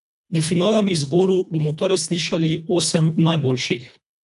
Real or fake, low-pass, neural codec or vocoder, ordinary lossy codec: fake; 10.8 kHz; codec, 24 kHz, 1.5 kbps, HILCodec; none